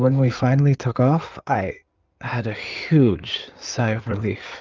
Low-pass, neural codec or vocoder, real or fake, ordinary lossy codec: 7.2 kHz; codec, 16 kHz in and 24 kHz out, 2.2 kbps, FireRedTTS-2 codec; fake; Opus, 24 kbps